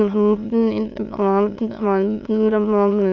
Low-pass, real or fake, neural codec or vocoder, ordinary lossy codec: 7.2 kHz; fake; autoencoder, 22.05 kHz, a latent of 192 numbers a frame, VITS, trained on many speakers; none